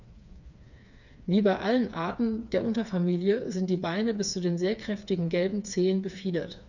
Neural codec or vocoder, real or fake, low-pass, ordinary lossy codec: codec, 16 kHz, 4 kbps, FreqCodec, smaller model; fake; 7.2 kHz; none